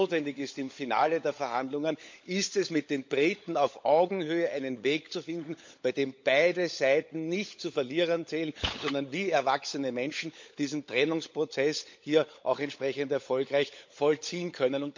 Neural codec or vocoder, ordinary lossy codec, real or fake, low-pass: codec, 16 kHz, 16 kbps, FunCodec, trained on LibriTTS, 50 frames a second; MP3, 48 kbps; fake; 7.2 kHz